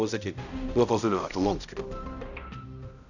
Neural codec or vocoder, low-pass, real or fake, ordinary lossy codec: codec, 16 kHz, 0.5 kbps, X-Codec, HuBERT features, trained on balanced general audio; 7.2 kHz; fake; none